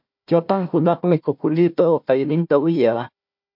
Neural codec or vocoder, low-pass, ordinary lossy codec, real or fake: codec, 16 kHz, 1 kbps, FunCodec, trained on Chinese and English, 50 frames a second; 5.4 kHz; MP3, 48 kbps; fake